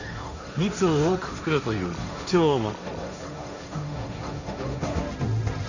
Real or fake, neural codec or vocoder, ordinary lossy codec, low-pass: fake; codec, 16 kHz, 1.1 kbps, Voila-Tokenizer; none; 7.2 kHz